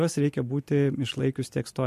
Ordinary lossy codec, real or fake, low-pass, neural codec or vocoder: MP3, 64 kbps; real; 14.4 kHz; none